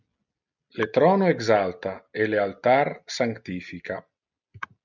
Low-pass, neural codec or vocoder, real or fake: 7.2 kHz; none; real